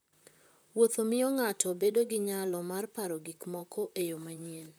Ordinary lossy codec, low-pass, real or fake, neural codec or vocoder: none; none; fake; vocoder, 44.1 kHz, 128 mel bands, Pupu-Vocoder